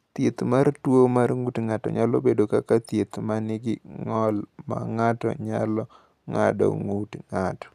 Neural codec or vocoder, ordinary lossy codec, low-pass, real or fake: none; none; 14.4 kHz; real